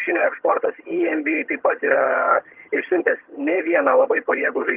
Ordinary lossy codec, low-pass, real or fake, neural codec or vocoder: Opus, 32 kbps; 3.6 kHz; fake; vocoder, 22.05 kHz, 80 mel bands, HiFi-GAN